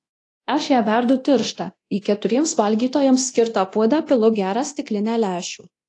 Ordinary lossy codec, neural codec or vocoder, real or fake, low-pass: AAC, 48 kbps; codec, 24 kHz, 0.9 kbps, DualCodec; fake; 10.8 kHz